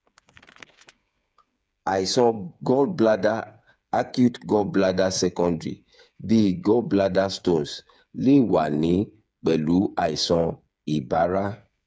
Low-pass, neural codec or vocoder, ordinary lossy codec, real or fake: none; codec, 16 kHz, 8 kbps, FreqCodec, smaller model; none; fake